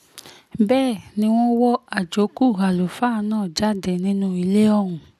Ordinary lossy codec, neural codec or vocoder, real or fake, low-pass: none; none; real; 14.4 kHz